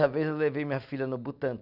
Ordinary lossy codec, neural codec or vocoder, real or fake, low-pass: none; none; real; 5.4 kHz